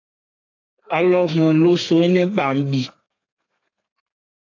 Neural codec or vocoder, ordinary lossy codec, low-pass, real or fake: codec, 32 kHz, 1.9 kbps, SNAC; AAC, 48 kbps; 7.2 kHz; fake